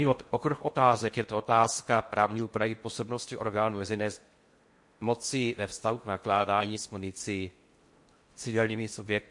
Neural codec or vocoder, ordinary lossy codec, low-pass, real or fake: codec, 16 kHz in and 24 kHz out, 0.6 kbps, FocalCodec, streaming, 4096 codes; MP3, 48 kbps; 10.8 kHz; fake